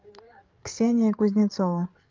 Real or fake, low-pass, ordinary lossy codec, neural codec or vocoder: fake; 7.2 kHz; Opus, 24 kbps; codec, 16 kHz, 8 kbps, FreqCodec, larger model